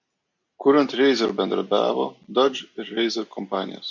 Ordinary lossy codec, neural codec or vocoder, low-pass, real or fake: MP3, 64 kbps; none; 7.2 kHz; real